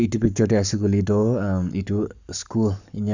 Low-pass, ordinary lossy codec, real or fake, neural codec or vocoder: 7.2 kHz; none; fake; codec, 44.1 kHz, 7.8 kbps, Pupu-Codec